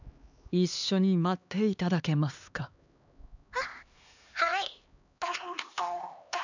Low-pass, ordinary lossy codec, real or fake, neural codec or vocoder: 7.2 kHz; none; fake; codec, 16 kHz, 2 kbps, X-Codec, HuBERT features, trained on LibriSpeech